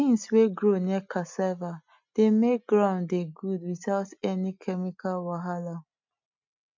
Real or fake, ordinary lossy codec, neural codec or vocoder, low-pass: real; MP3, 64 kbps; none; 7.2 kHz